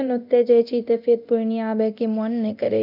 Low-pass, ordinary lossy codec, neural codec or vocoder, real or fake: 5.4 kHz; none; codec, 24 kHz, 0.9 kbps, DualCodec; fake